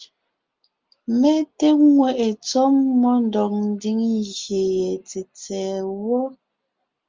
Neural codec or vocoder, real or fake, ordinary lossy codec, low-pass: none; real; Opus, 32 kbps; 7.2 kHz